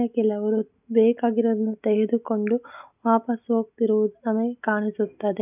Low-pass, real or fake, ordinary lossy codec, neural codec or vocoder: 3.6 kHz; real; none; none